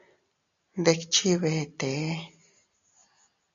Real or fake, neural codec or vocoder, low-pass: real; none; 7.2 kHz